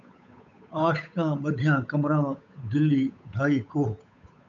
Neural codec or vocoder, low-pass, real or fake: codec, 16 kHz, 8 kbps, FunCodec, trained on Chinese and English, 25 frames a second; 7.2 kHz; fake